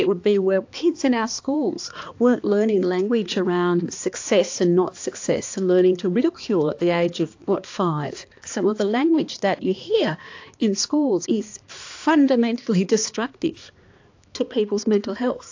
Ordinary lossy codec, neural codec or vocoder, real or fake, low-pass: AAC, 48 kbps; codec, 16 kHz, 2 kbps, X-Codec, HuBERT features, trained on balanced general audio; fake; 7.2 kHz